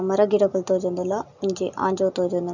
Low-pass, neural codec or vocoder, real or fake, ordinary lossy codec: 7.2 kHz; none; real; none